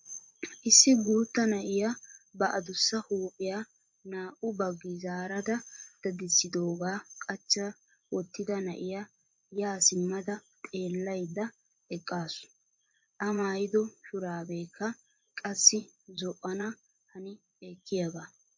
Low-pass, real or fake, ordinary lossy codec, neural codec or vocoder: 7.2 kHz; fake; MP3, 48 kbps; vocoder, 24 kHz, 100 mel bands, Vocos